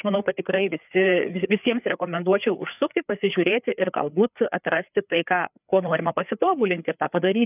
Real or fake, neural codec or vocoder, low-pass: fake; codec, 16 kHz, 4 kbps, FreqCodec, larger model; 3.6 kHz